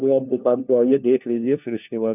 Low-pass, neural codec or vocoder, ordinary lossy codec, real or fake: 3.6 kHz; codec, 16 kHz, 1 kbps, FunCodec, trained on LibriTTS, 50 frames a second; none; fake